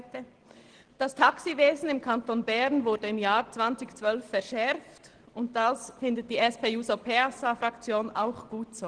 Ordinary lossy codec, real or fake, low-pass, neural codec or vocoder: Opus, 16 kbps; real; 9.9 kHz; none